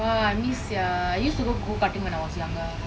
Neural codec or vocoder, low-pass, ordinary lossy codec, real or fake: none; none; none; real